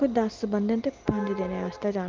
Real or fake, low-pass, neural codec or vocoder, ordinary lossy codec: real; 7.2 kHz; none; Opus, 32 kbps